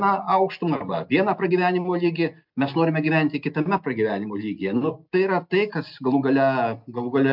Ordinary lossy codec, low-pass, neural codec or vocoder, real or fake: MP3, 48 kbps; 5.4 kHz; none; real